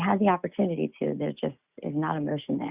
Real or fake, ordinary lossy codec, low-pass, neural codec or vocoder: real; Opus, 64 kbps; 3.6 kHz; none